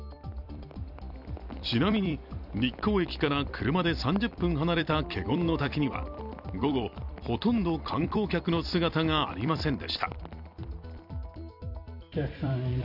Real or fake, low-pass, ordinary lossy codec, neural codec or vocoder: fake; 5.4 kHz; none; vocoder, 44.1 kHz, 128 mel bands every 256 samples, BigVGAN v2